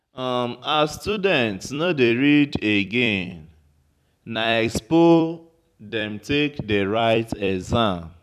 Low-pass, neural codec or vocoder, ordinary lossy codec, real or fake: 14.4 kHz; vocoder, 44.1 kHz, 128 mel bands every 256 samples, BigVGAN v2; none; fake